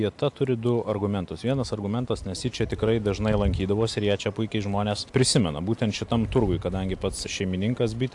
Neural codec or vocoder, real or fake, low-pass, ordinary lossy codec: none; real; 10.8 kHz; AAC, 64 kbps